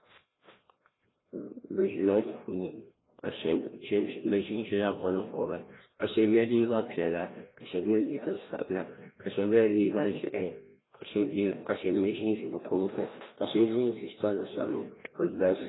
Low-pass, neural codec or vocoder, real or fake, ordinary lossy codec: 7.2 kHz; codec, 16 kHz, 1 kbps, FreqCodec, larger model; fake; AAC, 16 kbps